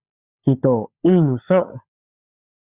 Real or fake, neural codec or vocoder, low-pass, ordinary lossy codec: fake; codec, 16 kHz, 16 kbps, FunCodec, trained on LibriTTS, 50 frames a second; 3.6 kHz; Opus, 64 kbps